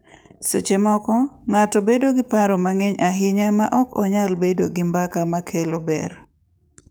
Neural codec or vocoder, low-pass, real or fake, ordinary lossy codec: codec, 44.1 kHz, 7.8 kbps, DAC; none; fake; none